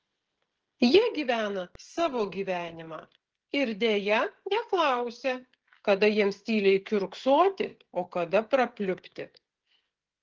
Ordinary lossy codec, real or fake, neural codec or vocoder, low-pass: Opus, 16 kbps; fake; codec, 16 kHz, 16 kbps, FreqCodec, smaller model; 7.2 kHz